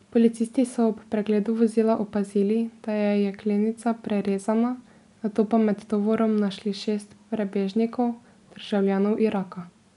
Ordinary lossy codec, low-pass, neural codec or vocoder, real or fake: none; 10.8 kHz; none; real